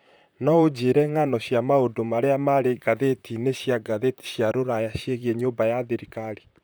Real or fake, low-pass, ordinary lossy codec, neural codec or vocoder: fake; none; none; vocoder, 44.1 kHz, 128 mel bands, Pupu-Vocoder